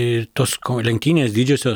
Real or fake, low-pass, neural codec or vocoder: real; 19.8 kHz; none